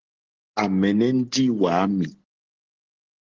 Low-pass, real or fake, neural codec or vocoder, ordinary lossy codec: 7.2 kHz; real; none; Opus, 16 kbps